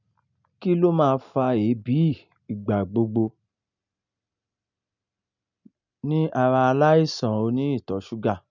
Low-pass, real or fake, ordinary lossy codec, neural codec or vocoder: 7.2 kHz; real; none; none